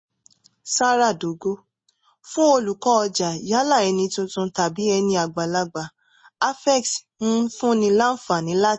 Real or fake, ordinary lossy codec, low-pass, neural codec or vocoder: real; MP3, 32 kbps; 9.9 kHz; none